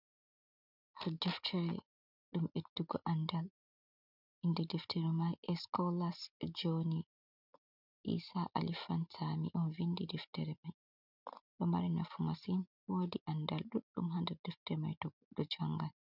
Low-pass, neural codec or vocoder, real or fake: 5.4 kHz; none; real